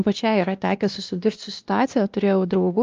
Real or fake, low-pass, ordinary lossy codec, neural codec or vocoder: fake; 7.2 kHz; Opus, 32 kbps; codec, 16 kHz, 1 kbps, X-Codec, WavLM features, trained on Multilingual LibriSpeech